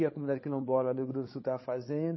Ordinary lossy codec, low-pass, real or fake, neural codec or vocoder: MP3, 24 kbps; 7.2 kHz; fake; codec, 16 kHz, 16 kbps, FreqCodec, larger model